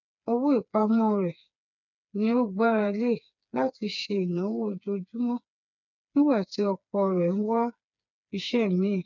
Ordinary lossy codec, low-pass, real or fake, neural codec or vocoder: none; 7.2 kHz; fake; codec, 16 kHz, 4 kbps, FreqCodec, smaller model